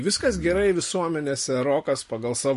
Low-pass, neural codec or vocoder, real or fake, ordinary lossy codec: 14.4 kHz; none; real; MP3, 48 kbps